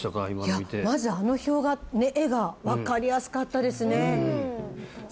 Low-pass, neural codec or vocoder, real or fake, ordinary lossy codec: none; none; real; none